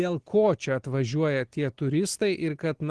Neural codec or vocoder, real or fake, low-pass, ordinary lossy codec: none; real; 10.8 kHz; Opus, 16 kbps